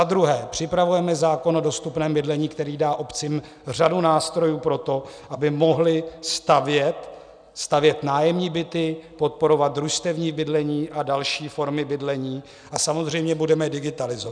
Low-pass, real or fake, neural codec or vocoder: 9.9 kHz; real; none